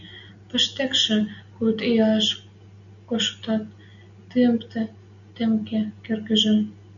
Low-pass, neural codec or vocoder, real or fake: 7.2 kHz; none; real